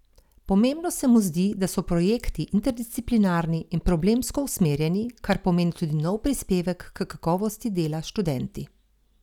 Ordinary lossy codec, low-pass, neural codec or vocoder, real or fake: none; 19.8 kHz; none; real